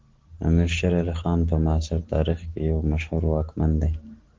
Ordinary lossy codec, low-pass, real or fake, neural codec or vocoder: Opus, 16 kbps; 7.2 kHz; real; none